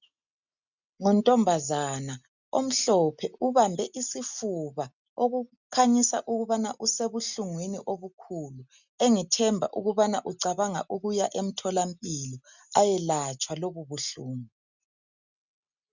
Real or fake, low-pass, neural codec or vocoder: real; 7.2 kHz; none